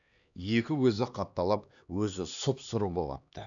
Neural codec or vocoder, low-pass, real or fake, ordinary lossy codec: codec, 16 kHz, 2 kbps, X-Codec, HuBERT features, trained on LibriSpeech; 7.2 kHz; fake; none